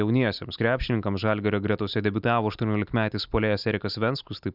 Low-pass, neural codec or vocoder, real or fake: 5.4 kHz; none; real